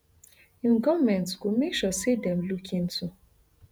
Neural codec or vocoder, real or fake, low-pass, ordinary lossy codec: vocoder, 48 kHz, 128 mel bands, Vocos; fake; none; none